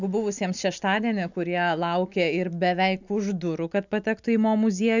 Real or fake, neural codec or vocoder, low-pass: real; none; 7.2 kHz